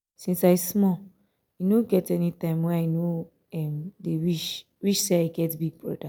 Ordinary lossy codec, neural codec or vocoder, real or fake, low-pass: none; none; real; none